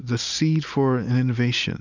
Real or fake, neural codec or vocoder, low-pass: real; none; 7.2 kHz